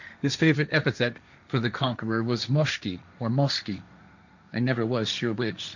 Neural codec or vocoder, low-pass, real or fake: codec, 16 kHz, 1.1 kbps, Voila-Tokenizer; 7.2 kHz; fake